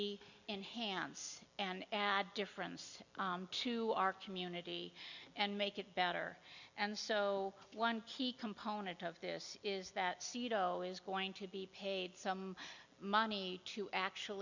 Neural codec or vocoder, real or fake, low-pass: none; real; 7.2 kHz